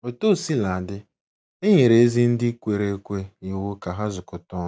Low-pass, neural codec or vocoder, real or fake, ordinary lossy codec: none; none; real; none